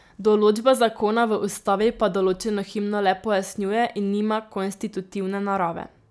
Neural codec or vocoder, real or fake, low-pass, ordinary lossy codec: none; real; none; none